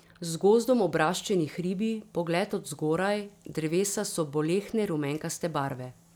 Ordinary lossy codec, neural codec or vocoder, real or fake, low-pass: none; none; real; none